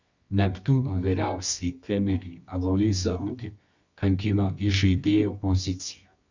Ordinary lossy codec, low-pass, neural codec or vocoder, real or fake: Opus, 64 kbps; 7.2 kHz; codec, 24 kHz, 0.9 kbps, WavTokenizer, medium music audio release; fake